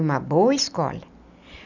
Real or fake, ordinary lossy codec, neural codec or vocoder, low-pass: real; none; none; 7.2 kHz